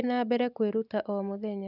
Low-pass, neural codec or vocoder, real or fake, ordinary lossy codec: 5.4 kHz; none; real; none